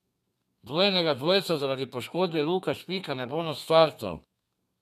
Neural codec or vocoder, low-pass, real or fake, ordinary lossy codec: codec, 32 kHz, 1.9 kbps, SNAC; 14.4 kHz; fake; none